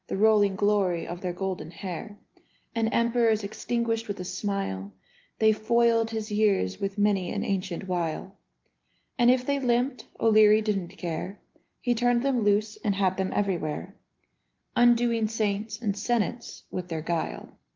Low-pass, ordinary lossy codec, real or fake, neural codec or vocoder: 7.2 kHz; Opus, 32 kbps; real; none